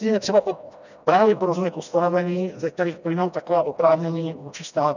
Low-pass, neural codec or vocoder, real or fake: 7.2 kHz; codec, 16 kHz, 1 kbps, FreqCodec, smaller model; fake